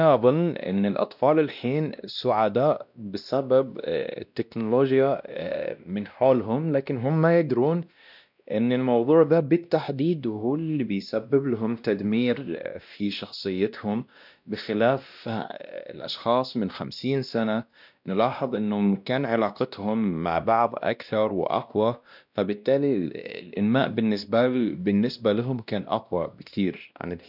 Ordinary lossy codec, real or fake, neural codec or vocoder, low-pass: none; fake; codec, 16 kHz, 1 kbps, X-Codec, WavLM features, trained on Multilingual LibriSpeech; 5.4 kHz